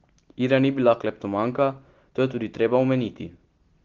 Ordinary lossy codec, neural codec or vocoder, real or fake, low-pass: Opus, 16 kbps; none; real; 7.2 kHz